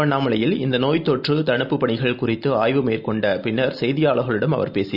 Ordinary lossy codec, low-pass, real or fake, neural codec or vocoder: none; 5.4 kHz; real; none